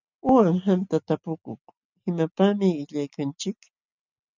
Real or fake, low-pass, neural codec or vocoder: real; 7.2 kHz; none